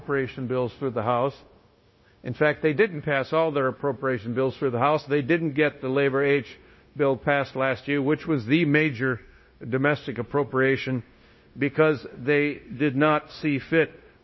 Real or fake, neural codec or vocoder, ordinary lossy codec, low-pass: fake; codec, 16 kHz, 0.9 kbps, LongCat-Audio-Codec; MP3, 24 kbps; 7.2 kHz